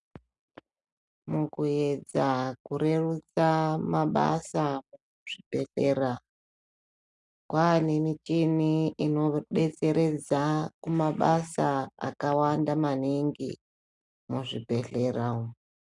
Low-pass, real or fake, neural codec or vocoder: 10.8 kHz; real; none